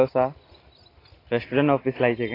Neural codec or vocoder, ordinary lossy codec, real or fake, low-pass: none; AAC, 24 kbps; real; 5.4 kHz